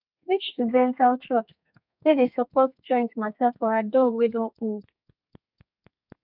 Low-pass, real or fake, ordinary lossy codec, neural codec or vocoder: 5.4 kHz; fake; none; codec, 32 kHz, 1.9 kbps, SNAC